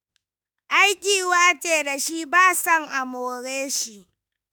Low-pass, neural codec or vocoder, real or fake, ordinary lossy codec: none; autoencoder, 48 kHz, 32 numbers a frame, DAC-VAE, trained on Japanese speech; fake; none